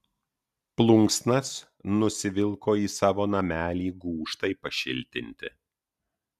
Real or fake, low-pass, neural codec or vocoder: real; 14.4 kHz; none